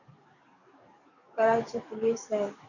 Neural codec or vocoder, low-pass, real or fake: codec, 44.1 kHz, 7.8 kbps, DAC; 7.2 kHz; fake